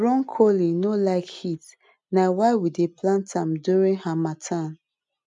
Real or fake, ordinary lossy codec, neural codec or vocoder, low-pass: real; none; none; 10.8 kHz